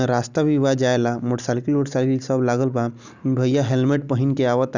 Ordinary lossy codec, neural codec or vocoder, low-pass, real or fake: none; none; 7.2 kHz; real